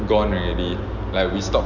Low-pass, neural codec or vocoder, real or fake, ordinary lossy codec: 7.2 kHz; none; real; none